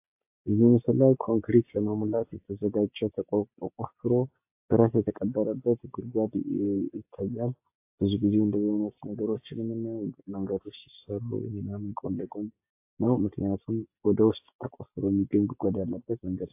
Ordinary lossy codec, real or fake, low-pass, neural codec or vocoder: AAC, 32 kbps; real; 3.6 kHz; none